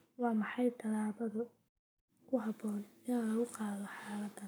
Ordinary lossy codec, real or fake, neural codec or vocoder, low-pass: none; fake; codec, 44.1 kHz, 7.8 kbps, Pupu-Codec; none